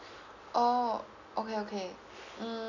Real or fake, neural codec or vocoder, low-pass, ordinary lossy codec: real; none; 7.2 kHz; none